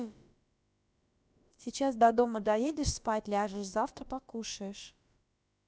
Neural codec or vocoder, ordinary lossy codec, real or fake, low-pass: codec, 16 kHz, about 1 kbps, DyCAST, with the encoder's durations; none; fake; none